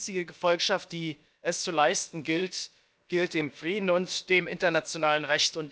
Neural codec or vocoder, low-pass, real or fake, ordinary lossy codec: codec, 16 kHz, about 1 kbps, DyCAST, with the encoder's durations; none; fake; none